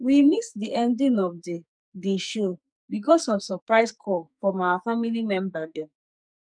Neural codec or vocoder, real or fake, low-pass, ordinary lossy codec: codec, 44.1 kHz, 2.6 kbps, SNAC; fake; 9.9 kHz; none